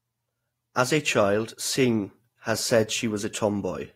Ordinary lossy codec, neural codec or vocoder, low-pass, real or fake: AAC, 48 kbps; vocoder, 48 kHz, 128 mel bands, Vocos; 19.8 kHz; fake